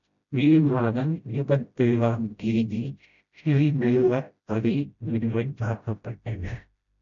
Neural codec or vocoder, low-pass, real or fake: codec, 16 kHz, 0.5 kbps, FreqCodec, smaller model; 7.2 kHz; fake